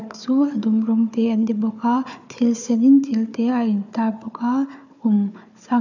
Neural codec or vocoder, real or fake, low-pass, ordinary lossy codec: codec, 16 kHz, 4 kbps, FunCodec, trained on Chinese and English, 50 frames a second; fake; 7.2 kHz; none